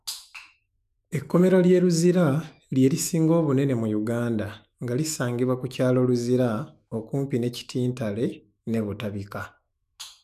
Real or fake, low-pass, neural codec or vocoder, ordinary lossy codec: fake; 14.4 kHz; autoencoder, 48 kHz, 128 numbers a frame, DAC-VAE, trained on Japanese speech; none